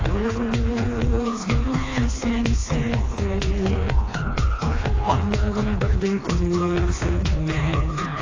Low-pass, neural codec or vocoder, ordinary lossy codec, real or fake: 7.2 kHz; codec, 16 kHz, 2 kbps, FreqCodec, smaller model; AAC, 32 kbps; fake